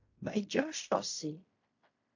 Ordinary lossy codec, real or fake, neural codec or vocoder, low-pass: AAC, 48 kbps; fake; codec, 16 kHz in and 24 kHz out, 0.4 kbps, LongCat-Audio-Codec, fine tuned four codebook decoder; 7.2 kHz